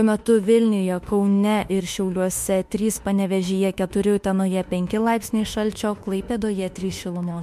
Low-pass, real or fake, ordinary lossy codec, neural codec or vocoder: 14.4 kHz; fake; MP3, 64 kbps; autoencoder, 48 kHz, 32 numbers a frame, DAC-VAE, trained on Japanese speech